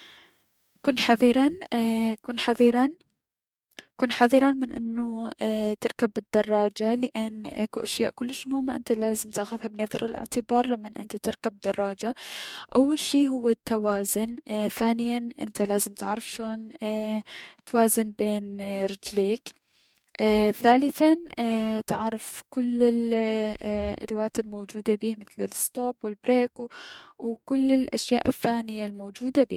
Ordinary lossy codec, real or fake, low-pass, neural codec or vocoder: MP3, 96 kbps; fake; 19.8 kHz; codec, 44.1 kHz, 2.6 kbps, DAC